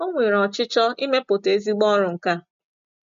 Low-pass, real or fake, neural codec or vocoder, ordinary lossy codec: 7.2 kHz; real; none; MP3, 48 kbps